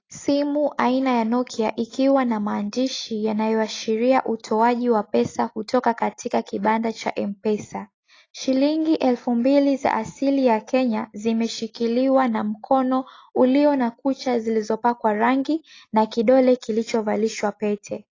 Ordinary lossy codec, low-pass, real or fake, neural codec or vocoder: AAC, 32 kbps; 7.2 kHz; real; none